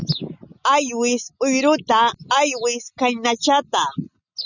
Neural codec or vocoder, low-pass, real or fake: none; 7.2 kHz; real